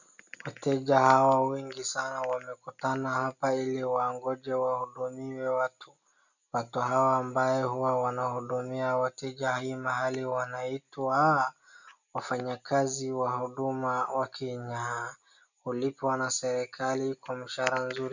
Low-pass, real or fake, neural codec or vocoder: 7.2 kHz; real; none